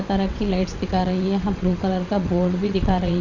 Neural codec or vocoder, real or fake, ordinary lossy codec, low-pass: vocoder, 22.05 kHz, 80 mel bands, WaveNeXt; fake; none; 7.2 kHz